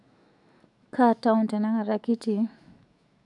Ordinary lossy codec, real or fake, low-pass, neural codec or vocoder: none; fake; 10.8 kHz; autoencoder, 48 kHz, 128 numbers a frame, DAC-VAE, trained on Japanese speech